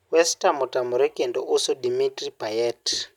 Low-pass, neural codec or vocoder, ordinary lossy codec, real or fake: 19.8 kHz; none; none; real